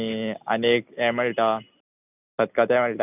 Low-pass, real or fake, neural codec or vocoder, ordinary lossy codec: 3.6 kHz; real; none; none